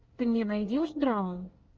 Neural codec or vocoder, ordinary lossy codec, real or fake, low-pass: codec, 24 kHz, 1 kbps, SNAC; Opus, 24 kbps; fake; 7.2 kHz